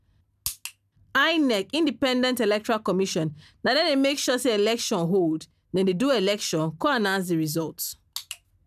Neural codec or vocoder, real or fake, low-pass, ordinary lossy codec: none; real; 14.4 kHz; none